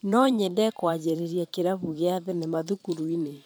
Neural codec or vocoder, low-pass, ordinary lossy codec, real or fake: vocoder, 44.1 kHz, 128 mel bands every 512 samples, BigVGAN v2; none; none; fake